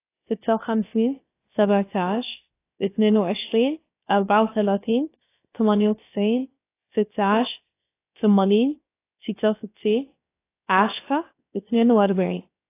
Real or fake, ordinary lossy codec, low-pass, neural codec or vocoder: fake; AAC, 24 kbps; 3.6 kHz; codec, 16 kHz, about 1 kbps, DyCAST, with the encoder's durations